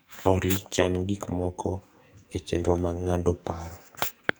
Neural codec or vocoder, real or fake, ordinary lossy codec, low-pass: codec, 44.1 kHz, 2.6 kbps, SNAC; fake; none; none